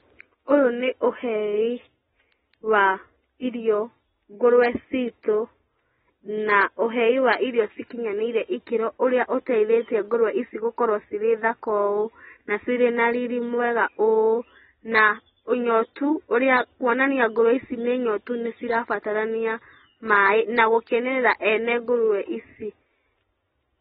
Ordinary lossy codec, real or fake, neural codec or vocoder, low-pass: AAC, 16 kbps; real; none; 19.8 kHz